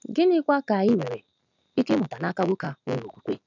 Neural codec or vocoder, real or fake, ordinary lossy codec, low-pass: none; real; none; 7.2 kHz